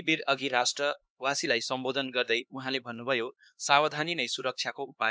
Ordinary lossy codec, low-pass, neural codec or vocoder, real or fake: none; none; codec, 16 kHz, 2 kbps, X-Codec, HuBERT features, trained on LibriSpeech; fake